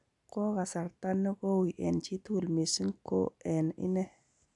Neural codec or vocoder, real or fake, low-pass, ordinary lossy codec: none; real; 10.8 kHz; none